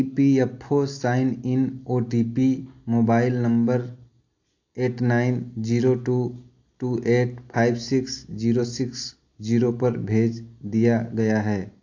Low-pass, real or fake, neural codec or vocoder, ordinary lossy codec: 7.2 kHz; real; none; AAC, 48 kbps